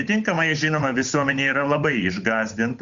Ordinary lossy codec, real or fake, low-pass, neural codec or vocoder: Opus, 64 kbps; fake; 7.2 kHz; codec, 16 kHz, 8 kbps, FunCodec, trained on Chinese and English, 25 frames a second